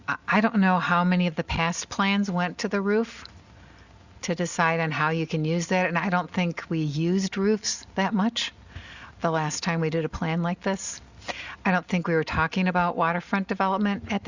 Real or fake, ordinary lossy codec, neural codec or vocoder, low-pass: real; Opus, 64 kbps; none; 7.2 kHz